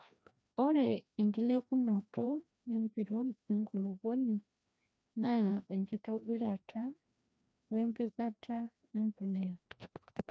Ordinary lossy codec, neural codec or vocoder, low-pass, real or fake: none; codec, 16 kHz, 1 kbps, FreqCodec, larger model; none; fake